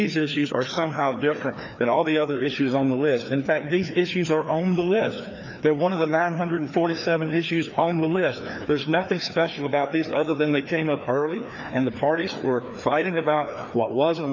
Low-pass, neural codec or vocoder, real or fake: 7.2 kHz; codec, 16 kHz, 2 kbps, FreqCodec, larger model; fake